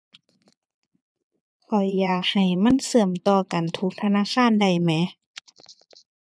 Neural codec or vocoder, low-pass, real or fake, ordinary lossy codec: vocoder, 22.05 kHz, 80 mel bands, Vocos; none; fake; none